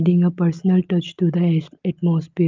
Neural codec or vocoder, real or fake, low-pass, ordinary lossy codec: none; real; 7.2 kHz; Opus, 24 kbps